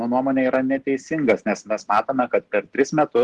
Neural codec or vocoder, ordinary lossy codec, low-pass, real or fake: none; Opus, 16 kbps; 10.8 kHz; real